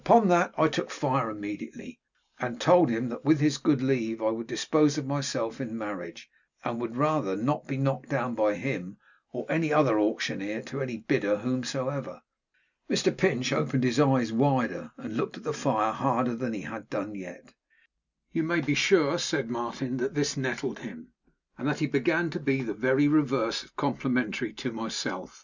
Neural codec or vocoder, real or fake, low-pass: none; real; 7.2 kHz